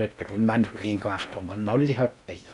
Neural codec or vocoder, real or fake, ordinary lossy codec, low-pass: codec, 16 kHz in and 24 kHz out, 0.8 kbps, FocalCodec, streaming, 65536 codes; fake; none; 10.8 kHz